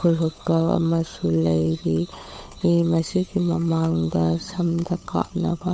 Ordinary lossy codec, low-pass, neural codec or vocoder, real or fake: none; none; codec, 16 kHz, 8 kbps, FunCodec, trained on Chinese and English, 25 frames a second; fake